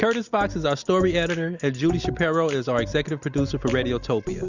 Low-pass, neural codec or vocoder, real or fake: 7.2 kHz; none; real